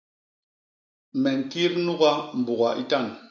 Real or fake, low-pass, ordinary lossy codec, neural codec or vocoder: real; 7.2 kHz; MP3, 64 kbps; none